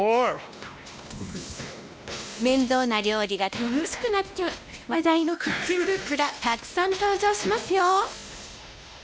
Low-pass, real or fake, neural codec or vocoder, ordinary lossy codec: none; fake; codec, 16 kHz, 1 kbps, X-Codec, WavLM features, trained on Multilingual LibriSpeech; none